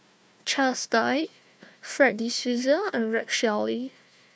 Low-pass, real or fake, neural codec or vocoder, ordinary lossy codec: none; fake; codec, 16 kHz, 1 kbps, FunCodec, trained on Chinese and English, 50 frames a second; none